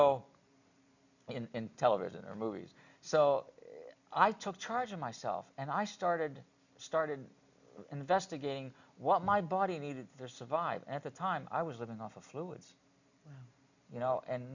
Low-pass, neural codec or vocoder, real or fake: 7.2 kHz; none; real